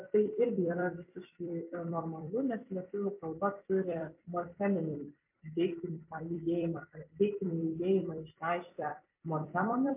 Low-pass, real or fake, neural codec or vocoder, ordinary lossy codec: 3.6 kHz; fake; vocoder, 44.1 kHz, 128 mel bands every 256 samples, BigVGAN v2; MP3, 32 kbps